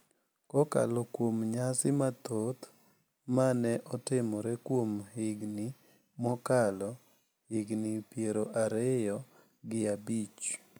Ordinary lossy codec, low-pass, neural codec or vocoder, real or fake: none; none; none; real